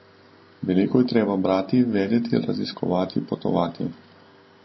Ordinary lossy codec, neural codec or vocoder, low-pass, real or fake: MP3, 24 kbps; none; 7.2 kHz; real